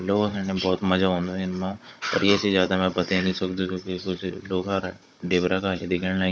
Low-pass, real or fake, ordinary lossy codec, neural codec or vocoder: none; fake; none; codec, 16 kHz, 16 kbps, FunCodec, trained on Chinese and English, 50 frames a second